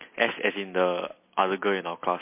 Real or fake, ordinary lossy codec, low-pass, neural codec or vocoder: real; MP3, 24 kbps; 3.6 kHz; none